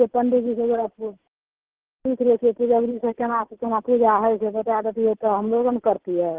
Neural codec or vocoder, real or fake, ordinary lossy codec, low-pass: none; real; Opus, 16 kbps; 3.6 kHz